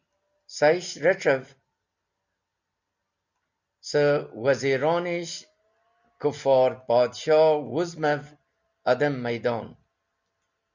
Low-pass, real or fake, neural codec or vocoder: 7.2 kHz; real; none